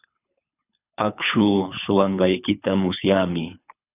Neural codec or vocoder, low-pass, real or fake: codec, 24 kHz, 3 kbps, HILCodec; 3.6 kHz; fake